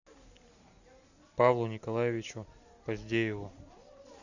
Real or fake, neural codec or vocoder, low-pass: real; none; 7.2 kHz